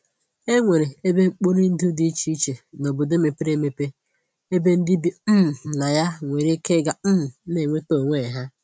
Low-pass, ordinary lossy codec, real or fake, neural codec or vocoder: none; none; real; none